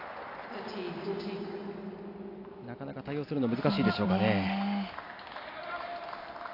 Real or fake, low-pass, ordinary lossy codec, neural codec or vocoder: real; 5.4 kHz; AAC, 32 kbps; none